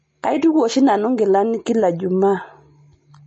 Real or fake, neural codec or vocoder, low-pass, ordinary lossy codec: real; none; 10.8 kHz; MP3, 32 kbps